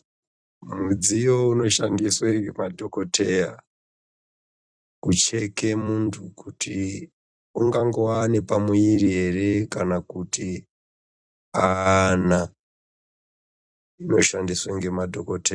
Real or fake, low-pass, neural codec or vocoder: fake; 9.9 kHz; vocoder, 44.1 kHz, 128 mel bands every 256 samples, BigVGAN v2